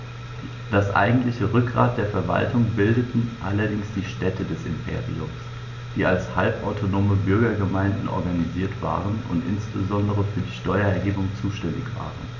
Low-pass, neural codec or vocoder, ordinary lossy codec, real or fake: 7.2 kHz; none; none; real